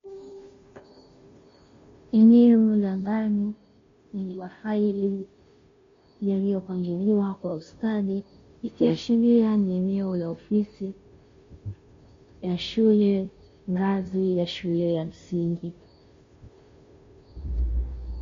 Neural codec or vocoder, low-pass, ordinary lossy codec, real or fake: codec, 16 kHz, 0.5 kbps, FunCodec, trained on Chinese and English, 25 frames a second; 7.2 kHz; MP3, 48 kbps; fake